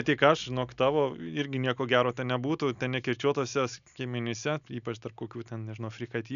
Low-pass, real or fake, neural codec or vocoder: 7.2 kHz; real; none